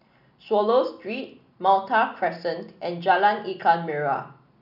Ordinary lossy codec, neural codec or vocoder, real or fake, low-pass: none; none; real; 5.4 kHz